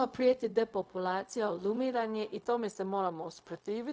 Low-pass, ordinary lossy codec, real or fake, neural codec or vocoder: none; none; fake; codec, 16 kHz, 0.4 kbps, LongCat-Audio-Codec